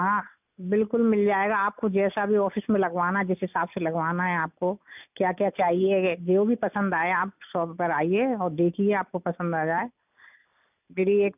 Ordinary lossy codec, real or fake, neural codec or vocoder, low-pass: none; real; none; 3.6 kHz